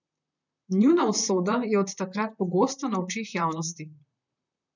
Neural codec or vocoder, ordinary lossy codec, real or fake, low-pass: vocoder, 44.1 kHz, 128 mel bands, Pupu-Vocoder; none; fake; 7.2 kHz